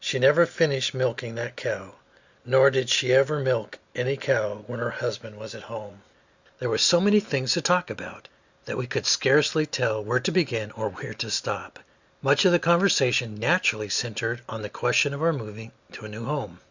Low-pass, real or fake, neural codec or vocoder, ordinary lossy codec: 7.2 kHz; real; none; Opus, 64 kbps